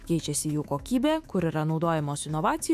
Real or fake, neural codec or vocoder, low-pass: fake; autoencoder, 48 kHz, 128 numbers a frame, DAC-VAE, trained on Japanese speech; 14.4 kHz